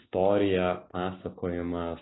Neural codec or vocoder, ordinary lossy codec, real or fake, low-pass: none; AAC, 16 kbps; real; 7.2 kHz